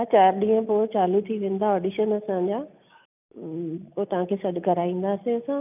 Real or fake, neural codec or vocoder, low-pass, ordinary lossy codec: real; none; 3.6 kHz; none